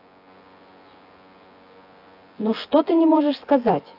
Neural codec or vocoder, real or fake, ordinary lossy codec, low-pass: vocoder, 24 kHz, 100 mel bands, Vocos; fake; AAC, 32 kbps; 5.4 kHz